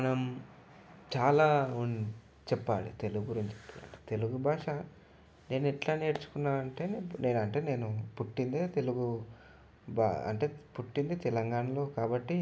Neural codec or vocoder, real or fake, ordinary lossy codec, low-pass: none; real; none; none